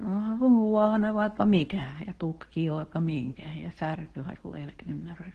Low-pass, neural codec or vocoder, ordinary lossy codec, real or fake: 10.8 kHz; codec, 24 kHz, 0.9 kbps, WavTokenizer, medium speech release version 2; Opus, 16 kbps; fake